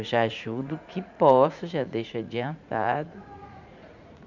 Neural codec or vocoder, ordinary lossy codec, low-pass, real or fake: vocoder, 44.1 kHz, 80 mel bands, Vocos; none; 7.2 kHz; fake